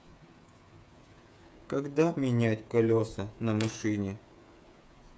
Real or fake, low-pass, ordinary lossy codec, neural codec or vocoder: fake; none; none; codec, 16 kHz, 8 kbps, FreqCodec, smaller model